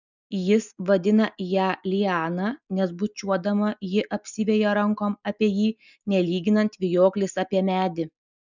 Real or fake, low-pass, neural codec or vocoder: real; 7.2 kHz; none